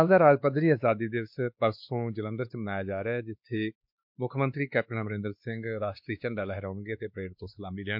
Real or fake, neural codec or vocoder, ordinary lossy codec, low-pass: fake; codec, 16 kHz, 2 kbps, X-Codec, WavLM features, trained on Multilingual LibriSpeech; MP3, 48 kbps; 5.4 kHz